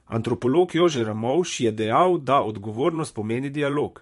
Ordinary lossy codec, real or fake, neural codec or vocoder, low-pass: MP3, 48 kbps; fake; vocoder, 44.1 kHz, 128 mel bands, Pupu-Vocoder; 14.4 kHz